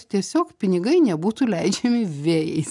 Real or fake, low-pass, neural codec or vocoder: real; 10.8 kHz; none